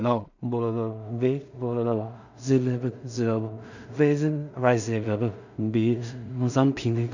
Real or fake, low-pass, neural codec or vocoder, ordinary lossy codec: fake; 7.2 kHz; codec, 16 kHz in and 24 kHz out, 0.4 kbps, LongCat-Audio-Codec, two codebook decoder; none